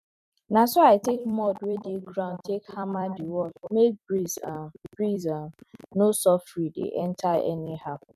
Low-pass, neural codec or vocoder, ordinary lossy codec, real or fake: 14.4 kHz; vocoder, 44.1 kHz, 128 mel bands every 256 samples, BigVGAN v2; none; fake